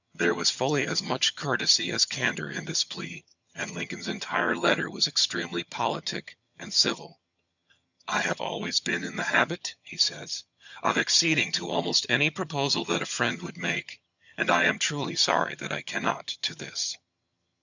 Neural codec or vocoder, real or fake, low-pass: vocoder, 22.05 kHz, 80 mel bands, HiFi-GAN; fake; 7.2 kHz